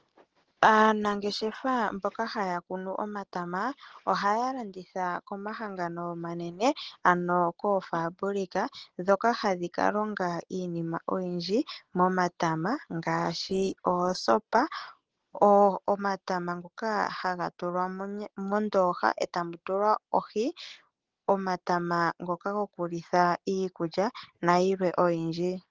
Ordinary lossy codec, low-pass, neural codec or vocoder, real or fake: Opus, 16 kbps; 7.2 kHz; none; real